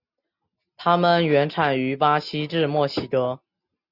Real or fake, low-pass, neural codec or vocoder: real; 5.4 kHz; none